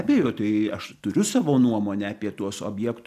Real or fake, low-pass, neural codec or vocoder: real; 14.4 kHz; none